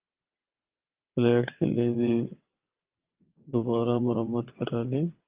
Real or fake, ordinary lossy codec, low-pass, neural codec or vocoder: fake; Opus, 32 kbps; 3.6 kHz; vocoder, 22.05 kHz, 80 mel bands, WaveNeXt